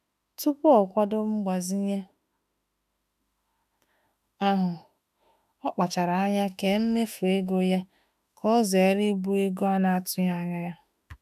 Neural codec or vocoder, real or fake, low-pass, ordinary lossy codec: autoencoder, 48 kHz, 32 numbers a frame, DAC-VAE, trained on Japanese speech; fake; 14.4 kHz; none